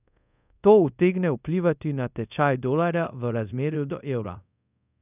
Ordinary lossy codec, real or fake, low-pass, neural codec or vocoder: none; fake; 3.6 kHz; codec, 24 kHz, 0.5 kbps, DualCodec